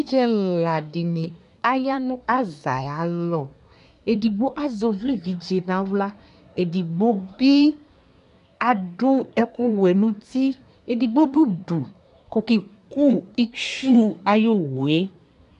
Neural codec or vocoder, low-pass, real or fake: codec, 24 kHz, 1 kbps, SNAC; 10.8 kHz; fake